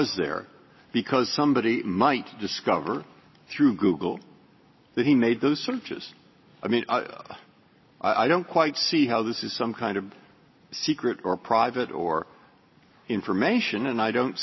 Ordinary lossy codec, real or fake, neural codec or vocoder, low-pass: MP3, 24 kbps; real; none; 7.2 kHz